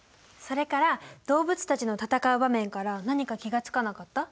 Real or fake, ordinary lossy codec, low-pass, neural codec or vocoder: real; none; none; none